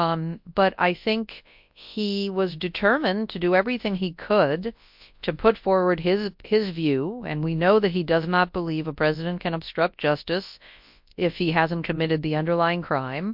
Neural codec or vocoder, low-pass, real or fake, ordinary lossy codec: codec, 24 kHz, 0.9 kbps, WavTokenizer, large speech release; 5.4 kHz; fake; MP3, 48 kbps